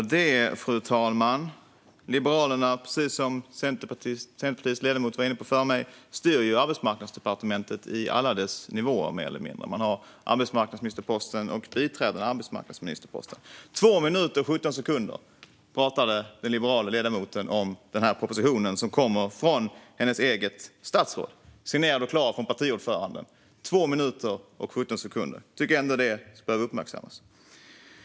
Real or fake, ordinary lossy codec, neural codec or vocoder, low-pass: real; none; none; none